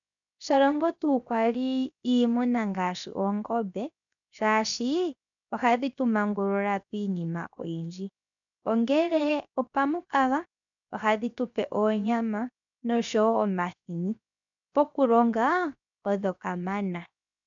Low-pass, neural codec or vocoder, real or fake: 7.2 kHz; codec, 16 kHz, 0.7 kbps, FocalCodec; fake